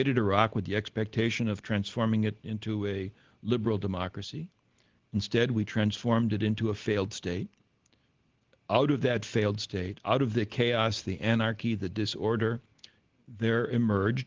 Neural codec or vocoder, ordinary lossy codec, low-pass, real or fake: none; Opus, 16 kbps; 7.2 kHz; real